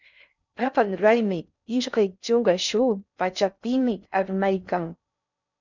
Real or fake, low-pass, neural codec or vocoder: fake; 7.2 kHz; codec, 16 kHz in and 24 kHz out, 0.6 kbps, FocalCodec, streaming, 4096 codes